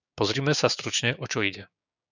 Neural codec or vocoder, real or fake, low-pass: codec, 16 kHz, 6 kbps, DAC; fake; 7.2 kHz